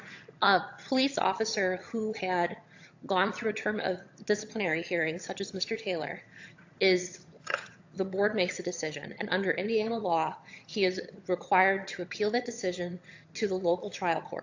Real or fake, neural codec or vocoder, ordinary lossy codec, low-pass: fake; vocoder, 22.05 kHz, 80 mel bands, HiFi-GAN; AAC, 48 kbps; 7.2 kHz